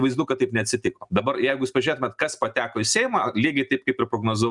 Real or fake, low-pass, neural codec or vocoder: real; 10.8 kHz; none